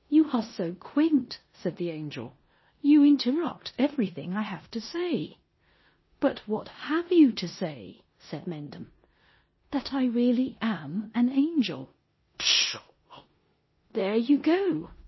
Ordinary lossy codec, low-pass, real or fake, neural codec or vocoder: MP3, 24 kbps; 7.2 kHz; fake; codec, 16 kHz in and 24 kHz out, 0.9 kbps, LongCat-Audio-Codec, four codebook decoder